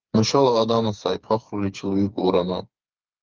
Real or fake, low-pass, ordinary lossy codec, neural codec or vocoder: fake; 7.2 kHz; Opus, 32 kbps; codec, 16 kHz, 4 kbps, FreqCodec, smaller model